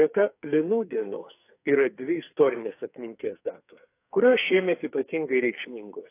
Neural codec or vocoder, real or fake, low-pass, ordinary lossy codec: codec, 44.1 kHz, 2.6 kbps, SNAC; fake; 3.6 kHz; AAC, 24 kbps